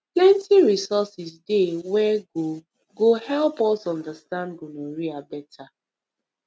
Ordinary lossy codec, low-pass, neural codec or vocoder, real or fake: none; none; none; real